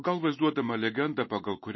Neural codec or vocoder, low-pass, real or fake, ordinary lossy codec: none; 7.2 kHz; real; MP3, 24 kbps